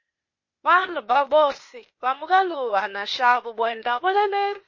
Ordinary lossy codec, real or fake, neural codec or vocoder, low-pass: MP3, 32 kbps; fake; codec, 16 kHz, 0.8 kbps, ZipCodec; 7.2 kHz